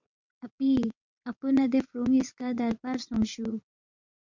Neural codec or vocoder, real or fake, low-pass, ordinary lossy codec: vocoder, 22.05 kHz, 80 mel bands, WaveNeXt; fake; 7.2 kHz; MP3, 64 kbps